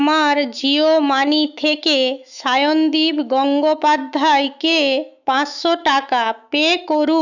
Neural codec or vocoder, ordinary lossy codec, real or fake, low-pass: autoencoder, 48 kHz, 128 numbers a frame, DAC-VAE, trained on Japanese speech; none; fake; 7.2 kHz